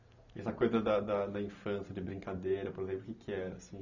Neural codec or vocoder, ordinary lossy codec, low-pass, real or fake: none; none; 7.2 kHz; real